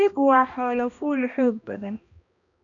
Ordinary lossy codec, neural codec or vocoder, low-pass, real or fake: none; codec, 16 kHz, 1 kbps, X-Codec, HuBERT features, trained on balanced general audio; 7.2 kHz; fake